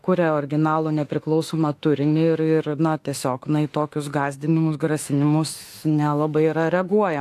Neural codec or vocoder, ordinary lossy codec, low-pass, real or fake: autoencoder, 48 kHz, 32 numbers a frame, DAC-VAE, trained on Japanese speech; AAC, 64 kbps; 14.4 kHz; fake